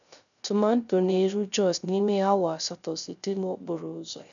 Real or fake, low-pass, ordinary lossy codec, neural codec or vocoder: fake; 7.2 kHz; none; codec, 16 kHz, 0.3 kbps, FocalCodec